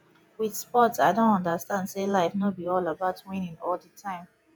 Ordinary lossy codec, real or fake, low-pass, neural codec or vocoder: none; fake; none; vocoder, 48 kHz, 128 mel bands, Vocos